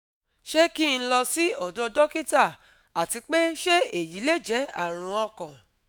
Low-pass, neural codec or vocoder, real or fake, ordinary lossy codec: none; autoencoder, 48 kHz, 128 numbers a frame, DAC-VAE, trained on Japanese speech; fake; none